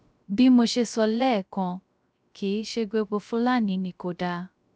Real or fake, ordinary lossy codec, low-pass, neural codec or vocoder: fake; none; none; codec, 16 kHz, 0.3 kbps, FocalCodec